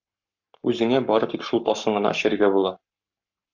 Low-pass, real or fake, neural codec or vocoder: 7.2 kHz; fake; codec, 44.1 kHz, 7.8 kbps, Pupu-Codec